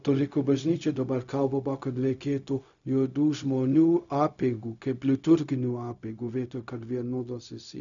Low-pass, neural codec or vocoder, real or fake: 7.2 kHz; codec, 16 kHz, 0.4 kbps, LongCat-Audio-Codec; fake